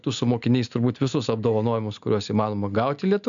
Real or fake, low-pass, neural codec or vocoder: real; 7.2 kHz; none